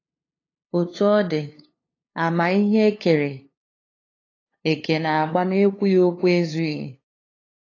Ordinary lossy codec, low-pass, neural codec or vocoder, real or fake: AAC, 32 kbps; 7.2 kHz; codec, 16 kHz, 2 kbps, FunCodec, trained on LibriTTS, 25 frames a second; fake